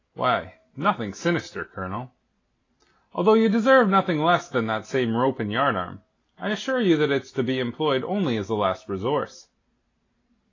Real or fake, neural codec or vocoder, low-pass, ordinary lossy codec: real; none; 7.2 kHz; AAC, 32 kbps